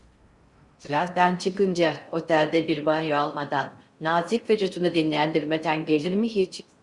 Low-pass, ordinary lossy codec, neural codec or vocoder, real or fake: 10.8 kHz; Opus, 64 kbps; codec, 16 kHz in and 24 kHz out, 0.6 kbps, FocalCodec, streaming, 4096 codes; fake